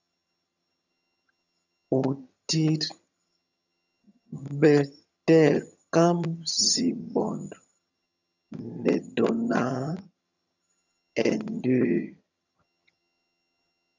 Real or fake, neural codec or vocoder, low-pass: fake; vocoder, 22.05 kHz, 80 mel bands, HiFi-GAN; 7.2 kHz